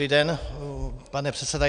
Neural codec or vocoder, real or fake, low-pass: none; real; 9.9 kHz